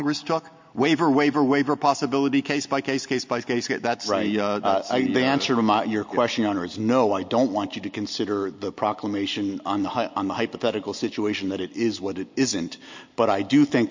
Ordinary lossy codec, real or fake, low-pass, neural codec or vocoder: MP3, 48 kbps; real; 7.2 kHz; none